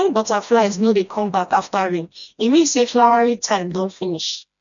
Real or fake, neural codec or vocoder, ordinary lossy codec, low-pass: fake; codec, 16 kHz, 1 kbps, FreqCodec, smaller model; none; 7.2 kHz